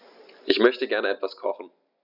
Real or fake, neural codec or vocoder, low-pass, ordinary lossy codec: real; none; 5.4 kHz; none